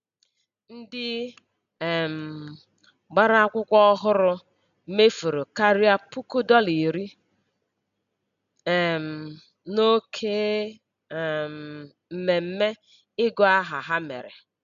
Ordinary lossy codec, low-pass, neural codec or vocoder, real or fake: none; 7.2 kHz; none; real